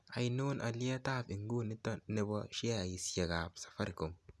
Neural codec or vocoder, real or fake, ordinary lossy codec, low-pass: none; real; none; none